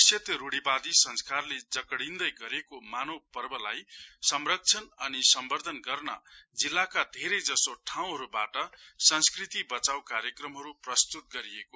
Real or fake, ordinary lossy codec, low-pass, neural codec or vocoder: real; none; none; none